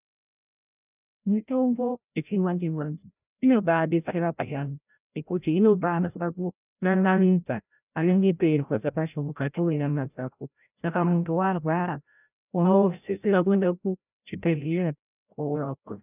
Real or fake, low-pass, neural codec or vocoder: fake; 3.6 kHz; codec, 16 kHz, 0.5 kbps, FreqCodec, larger model